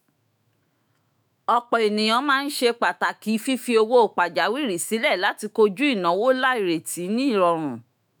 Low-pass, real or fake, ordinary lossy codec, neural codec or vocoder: none; fake; none; autoencoder, 48 kHz, 128 numbers a frame, DAC-VAE, trained on Japanese speech